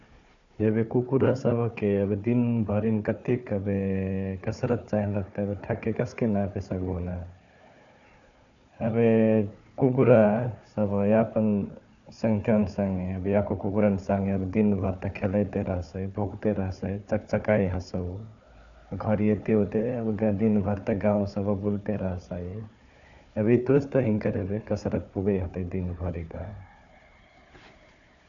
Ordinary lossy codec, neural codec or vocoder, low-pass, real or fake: none; codec, 16 kHz, 4 kbps, FunCodec, trained on Chinese and English, 50 frames a second; 7.2 kHz; fake